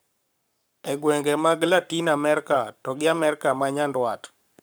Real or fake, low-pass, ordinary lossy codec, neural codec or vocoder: fake; none; none; codec, 44.1 kHz, 7.8 kbps, Pupu-Codec